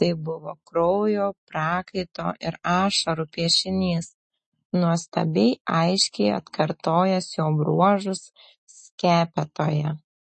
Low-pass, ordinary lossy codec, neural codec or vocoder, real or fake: 10.8 kHz; MP3, 32 kbps; none; real